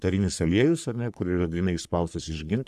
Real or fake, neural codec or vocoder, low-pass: fake; codec, 44.1 kHz, 3.4 kbps, Pupu-Codec; 14.4 kHz